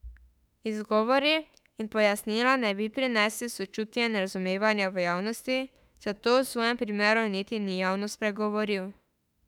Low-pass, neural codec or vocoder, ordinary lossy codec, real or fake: 19.8 kHz; autoencoder, 48 kHz, 32 numbers a frame, DAC-VAE, trained on Japanese speech; none; fake